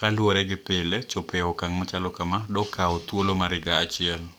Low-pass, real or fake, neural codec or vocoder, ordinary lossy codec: none; fake; codec, 44.1 kHz, 7.8 kbps, DAC; none